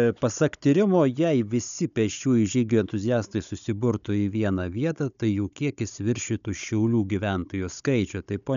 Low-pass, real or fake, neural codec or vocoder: 7.2 kHz; fake; codec, 16 kHz, 16 kbps, FunCodec, trained on Chinese and English, 50 frames a second